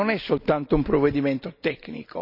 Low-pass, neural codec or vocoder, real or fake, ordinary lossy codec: 5.4 kHz; none; real; none